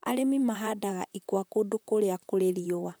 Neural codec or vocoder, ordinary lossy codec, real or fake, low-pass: vocoder, 44.1 kHz, 128 mel bands, Pupu-Vocoder; none; fake; none